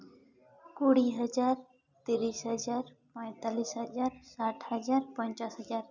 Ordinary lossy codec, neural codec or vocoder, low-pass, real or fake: none; none; 7.2 kHz; real